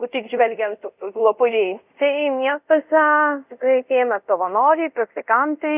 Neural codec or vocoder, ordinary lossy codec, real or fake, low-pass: codec, 24 kHz, 0.5 kbps, DualCodec; Opus, 64 kbps; fake; 3.6 kHz